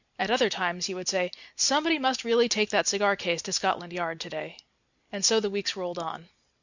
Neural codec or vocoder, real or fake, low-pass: none; real; 7.2 kHz